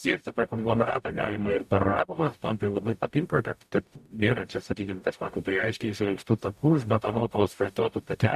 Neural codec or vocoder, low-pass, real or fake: codec, 44.1 kHz, 0.9 kbps, DAC; 14.4 kHz; fake